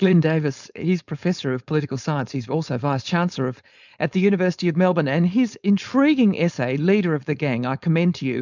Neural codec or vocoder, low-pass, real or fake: codec, 16 kHz, 4.8 kbps, FACodec; 7.2 kHz; fake